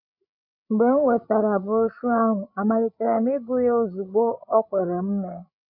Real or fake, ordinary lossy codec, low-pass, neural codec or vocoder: fake; none; 5.4 kHz; codec, 16 kHz, 16 kbps, FreqCodec, larger model